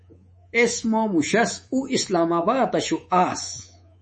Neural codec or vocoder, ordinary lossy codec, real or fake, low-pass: codec, 24 kHz, 3.1 kbps, DualCodec; MP3, 32 kbps; fake; 10.8 kHz